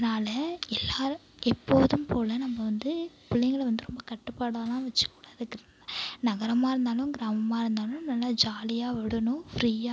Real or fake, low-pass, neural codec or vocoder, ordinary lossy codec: real; none; none; none